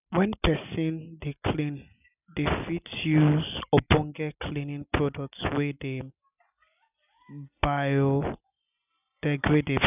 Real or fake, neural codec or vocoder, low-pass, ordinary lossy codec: real; none; 3.6 kHz; none